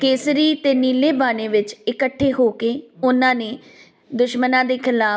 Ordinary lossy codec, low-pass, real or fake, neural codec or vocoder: none; none; real; none